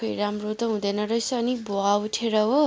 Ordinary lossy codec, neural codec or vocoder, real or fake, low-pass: none; none; real; none